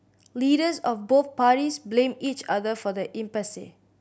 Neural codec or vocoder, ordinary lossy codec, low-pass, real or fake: none; none; none; real